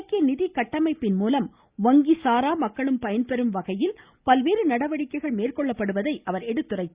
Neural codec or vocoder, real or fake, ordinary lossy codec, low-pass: none; real; Opus, 64 kbps; 3.6 kHz